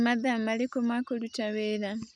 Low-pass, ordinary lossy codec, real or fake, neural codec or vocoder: none; none; real; none